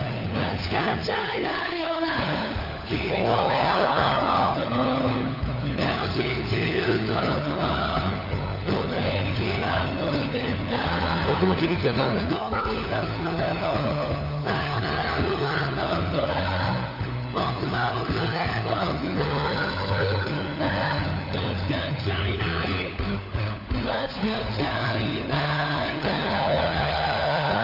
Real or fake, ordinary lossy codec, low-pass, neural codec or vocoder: fake; none; 5.4 kHz; codec, 16 kHz, 4 kbps, FunCodec, trained on LibriTTS, 50 frames a second